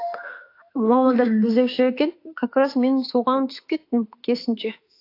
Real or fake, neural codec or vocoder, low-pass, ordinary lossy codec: fake; autoencoder, 48 kHz, 32 numbers a frame, DAC-VAE, trained on Japanese speech; 5.4 kHz; AAC, 32 kbps